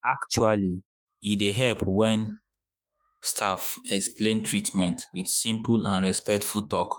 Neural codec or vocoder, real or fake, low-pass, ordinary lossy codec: autoencoder, 48 kHz, 32 numbers a frame, DAC-VAE, trained on Japanese speech; fake; none; none